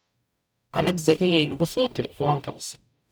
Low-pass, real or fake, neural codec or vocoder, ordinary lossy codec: none; fake; codec, 44.1 kHz, 0.9 kbps, DAC; none